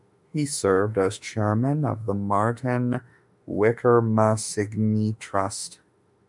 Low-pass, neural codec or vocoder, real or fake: 10.8 kHz; autoencoder, 48 kHz, 32 numbers a frame, DAC-VAE, trained on Japanese speech; fake